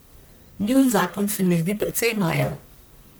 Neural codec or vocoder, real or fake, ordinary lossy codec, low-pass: codec, 44.1 kHz, 1.7 kbps, Pupu-Codec; fake; none; none